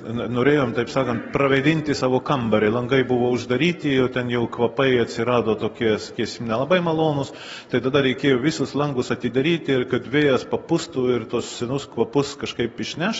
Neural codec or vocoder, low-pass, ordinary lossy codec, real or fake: none; 19.8 kHz; AAC, 24 kbps; real